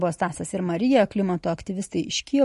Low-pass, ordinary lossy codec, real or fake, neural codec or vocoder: 14.4 kHz; MP3, 48 kbps; real; none